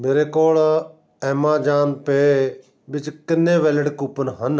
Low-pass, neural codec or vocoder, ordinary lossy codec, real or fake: none; none; none; real